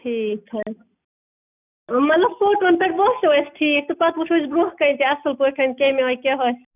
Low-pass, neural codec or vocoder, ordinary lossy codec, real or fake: 3.6 kHz; none; none; real